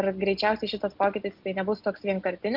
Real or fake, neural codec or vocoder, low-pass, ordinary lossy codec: real; none; 5.4 kHz; Opus, 32 kbps